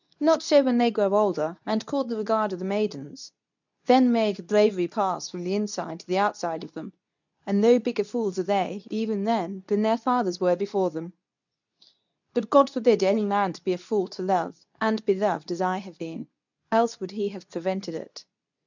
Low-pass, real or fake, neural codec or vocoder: 7.2 kHz; fake; codec, 24 kHz, 0.9 kbps, WavTokenizer, medium speech release version 2